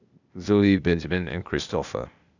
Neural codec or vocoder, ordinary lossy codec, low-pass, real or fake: codec, 16 kHz, 0.8 kbps, ZipCodec; none; 7.2 kHz; fake